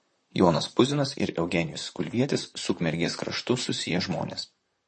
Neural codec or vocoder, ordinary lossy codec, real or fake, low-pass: vocoder, 44.1 kHz, 128 mel bands, Pupu-Vocoder; MP3, 32 kbps; fake; 10.8 kHz